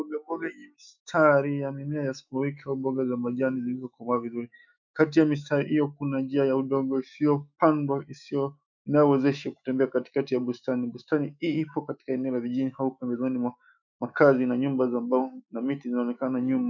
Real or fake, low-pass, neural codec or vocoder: fake; 7.2 kHz; autoencoder, 48 kHz, 128 numbers a frame, DAC-VAE, trained on Japanese speech